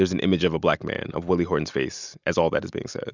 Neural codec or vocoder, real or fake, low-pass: none; real; 7.2 kHz